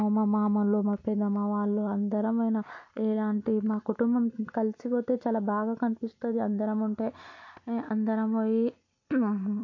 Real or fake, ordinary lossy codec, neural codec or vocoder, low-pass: real; MP3, 48 kbps; none; 7.2 kHz